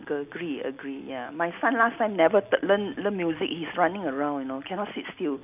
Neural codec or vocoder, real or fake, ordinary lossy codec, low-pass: none; real; none; 3.6 kHz